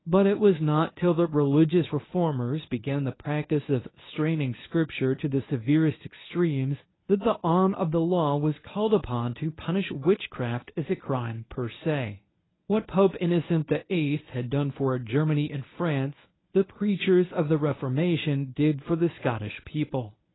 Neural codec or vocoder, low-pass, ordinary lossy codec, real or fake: codec, 24 kHz, 0.9 kbps, WavTokenizer, medium speech release version 1; 7.2 kHz; AAC, 16 kbps; fake